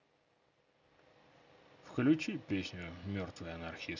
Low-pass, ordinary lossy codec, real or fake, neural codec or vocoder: 7.2 kHz; none; real; none